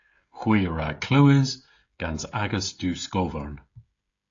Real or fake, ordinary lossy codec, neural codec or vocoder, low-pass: fake; AAC, 48 kbps; codec, 16 kHz, 16 kbps, FreqCodec, smaller model; 7.2 kHz